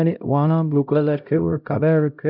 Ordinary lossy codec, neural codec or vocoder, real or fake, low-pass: none; codec, 16 kHz, 0.5 kbps, X-Codec, HuBERT features, trained on LibriSpeech; fake; 5.4 kHz